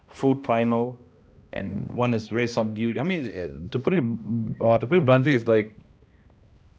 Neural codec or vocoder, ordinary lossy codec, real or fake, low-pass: codec, 16 kHz, 1 kbps, X-Codec, HuBERT features, trained on balanced general audio; none; fake; none